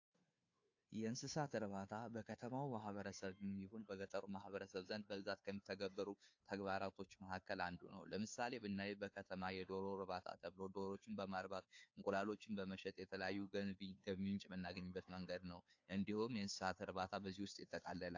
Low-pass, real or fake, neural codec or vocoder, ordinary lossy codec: 7.2 kHz; fake; codec, 16 kHz, 2 kbps, FunCodec, trained on Chinese and English, 25 frames a second; AAC, 48 kbps